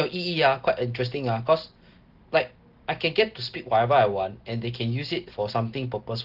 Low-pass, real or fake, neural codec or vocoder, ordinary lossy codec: 5.4 kHz; real; none; Opus, 16 kbps